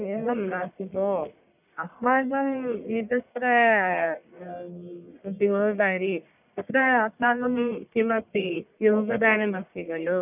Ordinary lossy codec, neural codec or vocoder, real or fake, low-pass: none; codec, 44.1 kHz, 1.7 kbps, Pupu-Codec; fake; 3.6 kHz